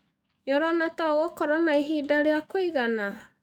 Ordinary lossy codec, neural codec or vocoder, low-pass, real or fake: none; codec, 44.1 kHz, 7.8 kbps, DAC; 19.8 kHz; fake